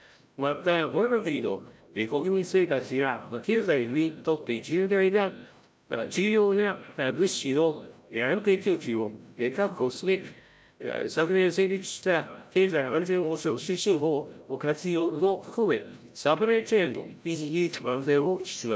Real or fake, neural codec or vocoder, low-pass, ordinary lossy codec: fake; codec, 16 kHz, 0.5 kbps, FreqCodec, larger model; none; none